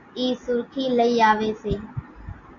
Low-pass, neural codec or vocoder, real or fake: 7.2 kHz; none; real